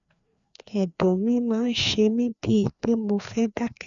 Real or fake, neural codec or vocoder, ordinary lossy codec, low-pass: fake; codec, 16 kHz, 2 kbps, FreqCodec, larger model; none; 7.2 kHz